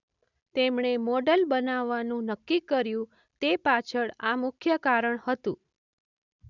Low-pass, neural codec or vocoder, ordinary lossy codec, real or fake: 7.2 kHz; none; none; real